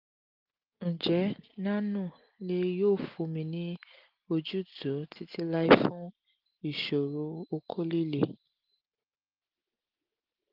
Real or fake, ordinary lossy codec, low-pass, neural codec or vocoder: real; Opus, 32 kbps; 5.4 kHz; none